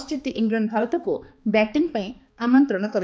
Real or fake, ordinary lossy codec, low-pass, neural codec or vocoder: fake; none; none; codec, 16 kHz, 2 kbps, X-Codec, HuBERT features, trained on balanced general audio